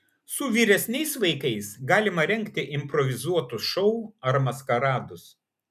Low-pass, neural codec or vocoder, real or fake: 14.4 kHz; none; real